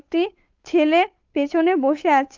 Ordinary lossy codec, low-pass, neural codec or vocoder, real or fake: Opus, 32 kbps; 7.2 kHz; codec, 16 kHz, 8 kbps, FunCodec, trained on Chinese and English, 25 frames a second; fake